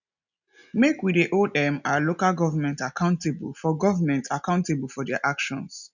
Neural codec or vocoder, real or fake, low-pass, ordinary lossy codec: none; real; 7.2 kHz; none